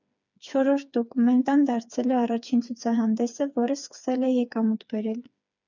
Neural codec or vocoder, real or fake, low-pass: codec, 16 kHz, 4 kbps, FreqCodec, smaller model; fake; 7.2 kHz